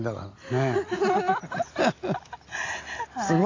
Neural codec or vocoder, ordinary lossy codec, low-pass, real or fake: none; AAC, 32 kbps; 7.2 kHz; real